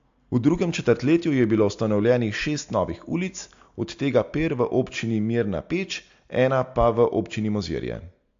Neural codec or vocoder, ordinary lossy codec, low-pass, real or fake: none; AAC, 64 kbps; 7.2 kHz; real